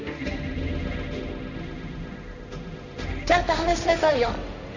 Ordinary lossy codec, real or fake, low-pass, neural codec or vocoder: none; fake; 7.2 kHz; codec, 16 kHz, 1.1 kbps, Voila-Tokenizer